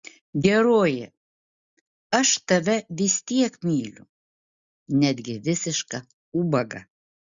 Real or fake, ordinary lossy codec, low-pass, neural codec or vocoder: real; Opus, 64 kbps; 7.2 kHz; none